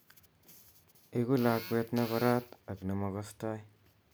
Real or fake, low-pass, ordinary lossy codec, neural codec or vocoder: real; none; none; none